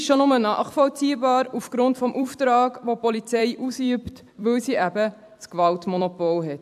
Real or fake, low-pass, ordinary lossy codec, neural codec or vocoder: fake; 14.4 kHz; AAC, 96 kbps; vocoder, 44.1 kHz, 128 mel bands every 256 samples, BigVGAN v2